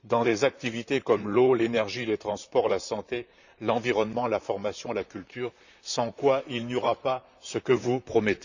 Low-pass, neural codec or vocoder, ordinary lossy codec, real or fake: 7.2 kHz; vocoder, 44.1 kHz, 128 mel bands, Pupu-Vocoder; none; fake